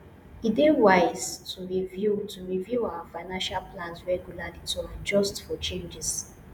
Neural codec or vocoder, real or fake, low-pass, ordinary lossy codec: vocoder, 48 kHz, 128 mel bands, Vocos; fake; none; none